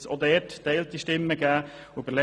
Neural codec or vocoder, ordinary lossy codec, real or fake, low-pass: none; none; real; none